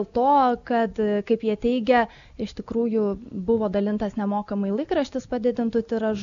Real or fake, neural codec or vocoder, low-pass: real; none; 7.2 kHz